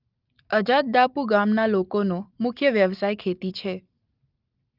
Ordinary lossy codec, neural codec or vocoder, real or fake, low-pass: Opus, 32 kbps; none; real; 5.4 kHz